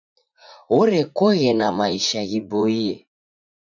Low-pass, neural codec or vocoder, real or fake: 7.2 kHz; vocoder, 44.1 kHz, 80 mel bands, Vocos; fake